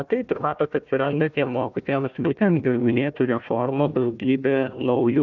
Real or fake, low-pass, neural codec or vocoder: fake; 7.2 kHz; codec, 16 kHz, 1 kbps, FunCodec, trained on Chinese and English, 50 frames a second